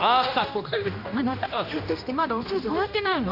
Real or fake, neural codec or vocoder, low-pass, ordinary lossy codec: fake; codec, 16 kHz, 1 kbps, X-Codec, HuBERT features, trained on general audio; 5.4 kHz; none